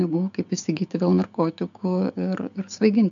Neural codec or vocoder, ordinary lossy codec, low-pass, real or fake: codec, 16 kHz, 6 kbps, DAC; MP3, 64 kbps; 7.2 kHz; fake